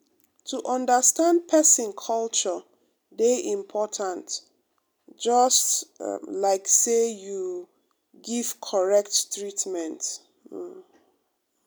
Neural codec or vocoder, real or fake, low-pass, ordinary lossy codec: none; real; none; none